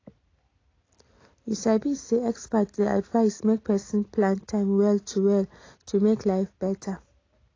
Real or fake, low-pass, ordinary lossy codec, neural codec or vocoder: real; 7.2 kHz; AAC, 32 kbps; none